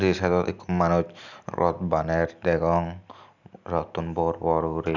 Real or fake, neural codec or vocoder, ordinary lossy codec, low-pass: real; none; none; 7.2 kHz